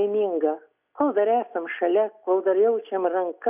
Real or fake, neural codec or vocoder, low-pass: real; none; 3.6 kHz